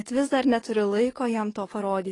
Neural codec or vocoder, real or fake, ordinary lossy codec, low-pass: codec, 44.1 kHz, 7.8 kbps, DAC; fake; AAC, 32 kbps; 10.8 kHz